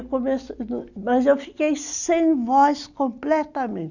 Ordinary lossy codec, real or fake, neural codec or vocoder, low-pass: none; real; none; 7.2 kHz